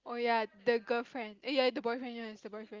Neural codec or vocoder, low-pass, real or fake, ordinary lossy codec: none; 7.2 kHz; real; Opus, 24 kbps